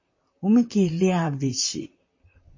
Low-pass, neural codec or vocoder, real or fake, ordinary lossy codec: 7.2 kHz; vocoder, 44.1 kHz, 128 mel bands, Pupu-Vocoder; fake; MP3, 32 kbps